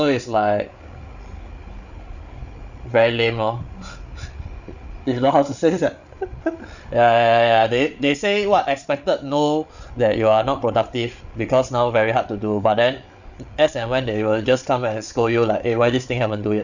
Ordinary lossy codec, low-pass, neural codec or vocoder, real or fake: none; 7.2 kHz; codec, 16 kHz, 8 kbps, FreqCodec, larger model; fake